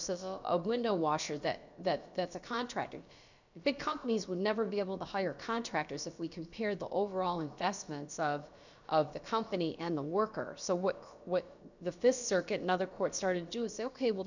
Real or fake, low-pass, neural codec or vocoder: fake; 7.2 kHz; codec, 16 kHz, about 1 kbps, DyCAST, with the encoder's durations